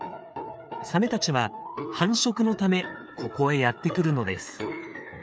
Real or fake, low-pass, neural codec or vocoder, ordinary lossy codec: fake; none; codec, 16 kHz, 4 kbps, FreqCodec, larger model; none